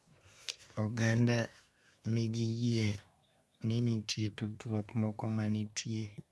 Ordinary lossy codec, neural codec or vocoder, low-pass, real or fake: none; codec, 24 kHz, 1 kbps, SNAC; none; fake